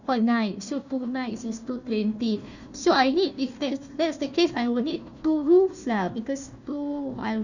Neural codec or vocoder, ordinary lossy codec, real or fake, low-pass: codec, 16 kHz, 1 kbps, FunCodec, trained on Chinese and English, 50 frames a second; none; fake; 7.2 kHz